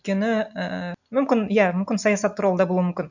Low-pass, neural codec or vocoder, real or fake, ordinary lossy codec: 7.2 kHz; none; real; none